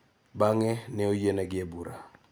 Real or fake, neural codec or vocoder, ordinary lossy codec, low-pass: real; none; none; none